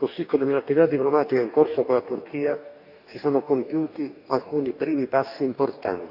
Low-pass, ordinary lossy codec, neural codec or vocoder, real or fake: 5.4 kHz; none; codec, 44.1 kHz, 2.6 kbps, DAC; fake